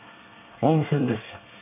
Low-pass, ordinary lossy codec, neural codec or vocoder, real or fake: 3.6 kHz; none; codec, 24 kHz, 1 kbps, SNAC; fake